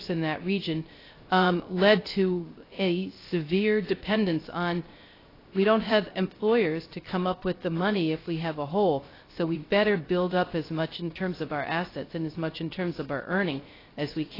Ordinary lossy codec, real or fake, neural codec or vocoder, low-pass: AAC, 24 kbps; fake; codec, 16 kHz, 0.3 kbps, FocalCodec; 5.4 kHz